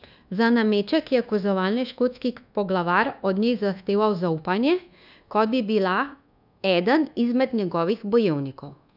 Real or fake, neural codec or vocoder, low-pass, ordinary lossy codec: fake; codec, 24 kHz, 1.2 kbps, DualCodec; 5.4 kHz; none